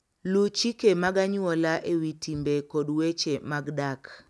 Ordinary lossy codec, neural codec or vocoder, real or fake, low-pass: none; none; real; none